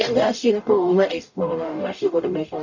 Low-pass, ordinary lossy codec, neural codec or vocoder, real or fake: 7.2 kHz; none; codec, 44.1 kHz, 0.9 kbps, DAC; fake